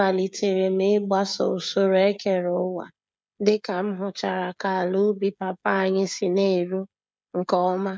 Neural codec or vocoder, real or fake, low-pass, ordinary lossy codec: codec, 16 kHz, 16 kbps, FreqCodec, smaller model; fake; none; none